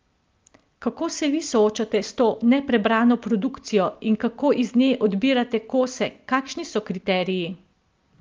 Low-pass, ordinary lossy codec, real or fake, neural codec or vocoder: 7.2 kHz; Opus, 32 kbps; real; none